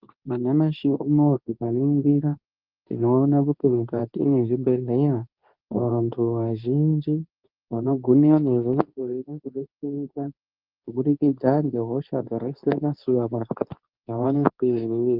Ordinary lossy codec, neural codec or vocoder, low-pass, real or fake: Opus, 24 kbps; codec, 24 kHz, 0.9 kbps, WavTokenizer, medium speech release version 2; 5.4 kHz; fake